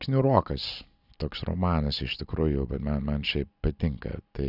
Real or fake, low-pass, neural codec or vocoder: real; 5.4 kHz; none